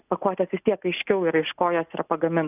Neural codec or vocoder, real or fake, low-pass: none; real; 3.6 kHz